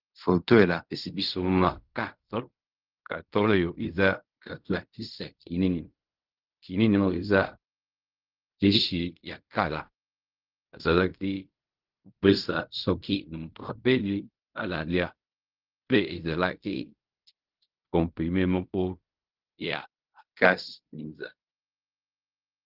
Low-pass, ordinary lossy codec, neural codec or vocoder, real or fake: 5.4 kHz; Opus, 24 kbps; codec, 16 kHz in and 24 kHz out, 0.4 kbps, LongCat-Audio-Codec, fine tuned four codebook decoder; fake